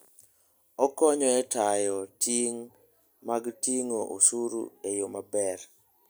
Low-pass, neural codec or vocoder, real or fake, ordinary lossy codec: none; none; real; none